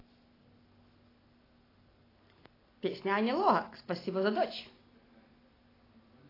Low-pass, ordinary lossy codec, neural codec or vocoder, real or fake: 5.4 kHz; AAC, 24 kbps; none; real